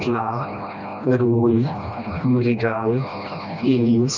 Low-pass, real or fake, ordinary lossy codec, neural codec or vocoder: 7.2 kHz; fake; none; codec, 16 kHz, 1 kbps, FreqCodec, smaller model